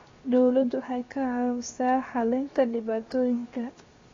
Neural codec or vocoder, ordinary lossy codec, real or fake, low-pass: codec, 16 kHz, 0.7 kbps, FocalCodec; AAC, 32 kbps; fake; 7.2 kHz